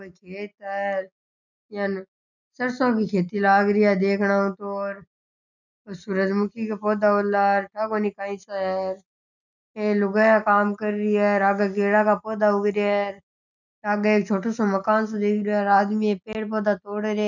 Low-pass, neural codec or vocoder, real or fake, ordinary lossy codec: 7.2 kHz; none; real; none